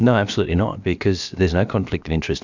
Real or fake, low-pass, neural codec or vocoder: fake; 7.2 kHz; codec, 16 kHz, about 1 kbps, DyCAST, with the encoder's durations